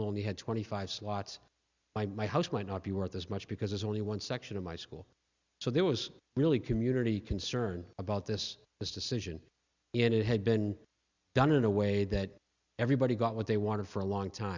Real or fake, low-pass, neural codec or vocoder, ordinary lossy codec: real; 7.2 kHz; none; Opus, 64 kbps